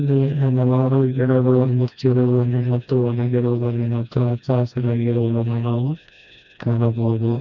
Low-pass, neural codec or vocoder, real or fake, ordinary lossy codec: 7.2 kHz; codec, 16 kHz, 1 kbps, FreqCodec, smaller model; fake; none